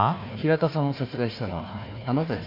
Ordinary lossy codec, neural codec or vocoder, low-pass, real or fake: MP3, 32 kbps; codec, 16 kHz, 1 kbps, FunCodec, trained on Chinese and English, 50 frames a second; 5.4 kHz; fake